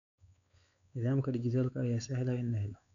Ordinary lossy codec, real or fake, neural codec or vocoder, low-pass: none; fake; codec, 16 kHz, 4 kbps, X-Codec, WavLM features, trained on Multilingual LibriSpeech; 7.2 kHz